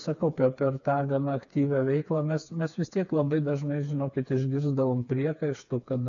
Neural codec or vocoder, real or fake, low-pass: codec, 16 kHz, 4 kbps, FreqCodec, smaller model; fake; 7.2 kHz